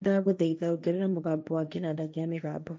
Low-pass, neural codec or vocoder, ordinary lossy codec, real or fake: none; codec, 16 kHz, 1.1 kbps, Voila-Tokenizer; none; fake